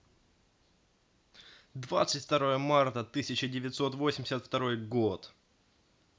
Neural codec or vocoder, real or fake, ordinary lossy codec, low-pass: none; real; none; none